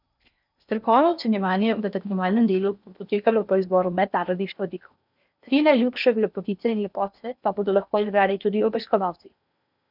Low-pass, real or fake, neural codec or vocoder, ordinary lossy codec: 5.4 kHz; fake; codec, 16 kHz in and 24 kHz out, 0.8 kbps, FocalCodec, streaming, 65536 codes; none